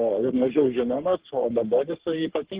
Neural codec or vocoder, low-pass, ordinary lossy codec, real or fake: codec, 44.1 kHz, 3.4 kbps, Pupu-Codec; 3.6 kHz; Opus, 16 kbps; fake